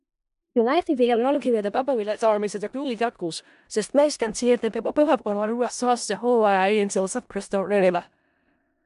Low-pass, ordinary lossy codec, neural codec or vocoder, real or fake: 10.8 kHz; MP3, 96 kbps; codec, 16 kHz in and 24 kHz out, 0.4 kbps, LongCat-Audio-Codec, four codebook decoder; fake